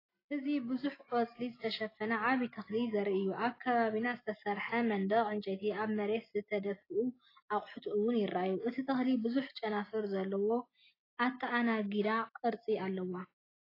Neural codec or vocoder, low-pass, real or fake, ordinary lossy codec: none; 5.4 kHz; real; AAC, 24 kbps